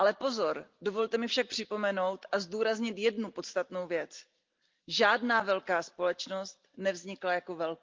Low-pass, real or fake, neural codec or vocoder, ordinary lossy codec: 7.2 kHz; real; none; Opus, 32 kbps